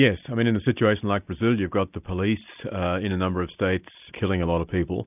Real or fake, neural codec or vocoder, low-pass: real; none; 3.6 kHz